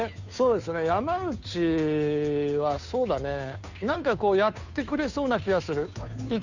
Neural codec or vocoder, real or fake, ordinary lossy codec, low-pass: codec, 16 kHz, 2 kbps, FunCodec, trained on Chinese and English, 25 frames a second; fake; none; 7.2 kHz